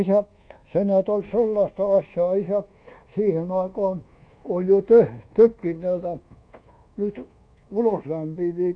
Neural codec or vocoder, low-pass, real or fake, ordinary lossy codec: codec, 24 kHz, 1.2 kbps, DualCodec; 9.9 kHz; fake; AAC, 48 kbps